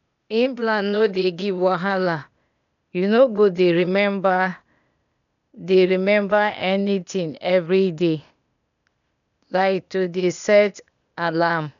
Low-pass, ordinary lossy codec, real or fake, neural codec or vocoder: 7.2 kHz; none; fake; codec, 16 kHz, 0.8 kbps, ZipCodec